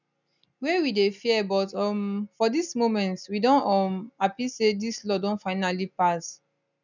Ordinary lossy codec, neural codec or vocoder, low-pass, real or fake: none; none; 7.2 kHz; real